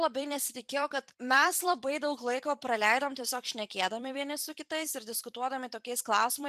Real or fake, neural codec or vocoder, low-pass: fake; vocoder, 44.1 kHz, 128 mel bands every 256 samples, BigVGAN v2; 14.4 kHz